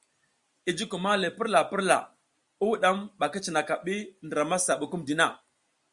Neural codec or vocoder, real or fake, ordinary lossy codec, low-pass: none; real; Opus, 64 kbps; 10.8 kHz